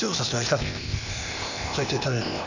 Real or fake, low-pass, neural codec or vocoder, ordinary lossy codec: fake; 7.2 kHz; codec, 16 kHz, 0.8 kbps, ZipCodec; none